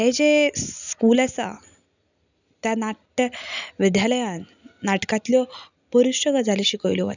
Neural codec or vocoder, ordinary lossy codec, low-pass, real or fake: none; none; 7.2 kHz; real